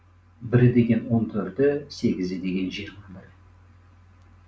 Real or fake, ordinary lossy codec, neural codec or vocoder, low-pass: real; none; none; none